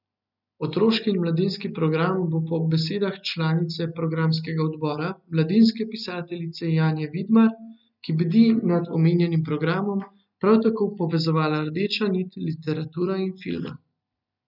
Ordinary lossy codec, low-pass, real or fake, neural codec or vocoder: none; 5.4 kHz; real; none